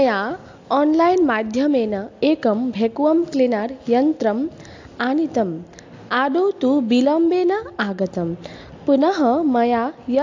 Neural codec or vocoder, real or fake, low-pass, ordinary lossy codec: none; real; 7.2 kHz; AAC, 48 kbps